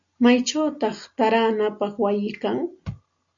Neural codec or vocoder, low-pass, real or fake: none; 7.2 kHz; real